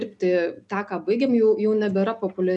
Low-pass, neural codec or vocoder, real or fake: 10.8 kHz; none; real